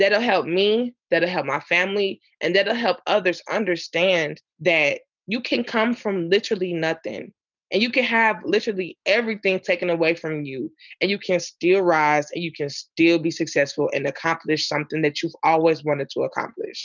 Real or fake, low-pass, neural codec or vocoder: real; 7.2 kHz; none